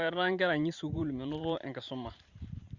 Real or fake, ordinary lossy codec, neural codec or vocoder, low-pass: fake; Opus, 64 kbps; vocoder, 44.1 kHz, 128 mel bands every 512 samples, BigVGAN v2; 7.2 kHz